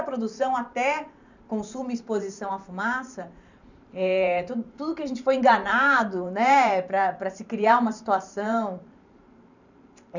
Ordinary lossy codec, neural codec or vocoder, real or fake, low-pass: none; vocoder, 44.1 kHz, 128 mel bands every 512 samples, BigVGAN v2; fake; 7.2 kHz